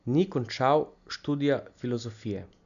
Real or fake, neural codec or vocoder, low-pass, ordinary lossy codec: real; none; 7.2 kHz; none